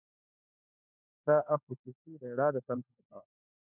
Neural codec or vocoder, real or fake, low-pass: codec, 16 kHz, 4 kbps, FunCodec, trained on LibriTTS, 50 frames a second; fake; 3.6 kHz